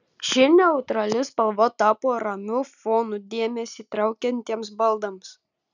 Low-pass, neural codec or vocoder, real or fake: 7.2 kHz; none; real